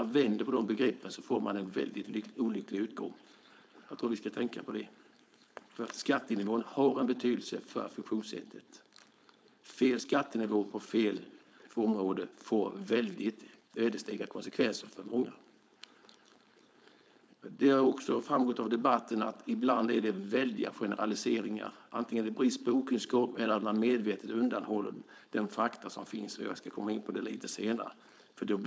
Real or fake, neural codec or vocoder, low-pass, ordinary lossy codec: fake; codec, 16 kHz, 4.8 kbps, FACodec; none; none